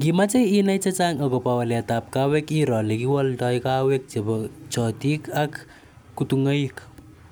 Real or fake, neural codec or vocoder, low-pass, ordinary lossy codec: real; none; none; none